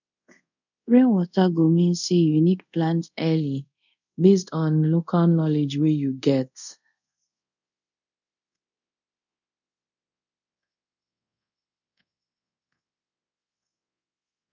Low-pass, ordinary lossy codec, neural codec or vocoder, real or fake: 7.2 kHz; none; codec, 24 kHz, 0.5 kbps, DualCodec; fake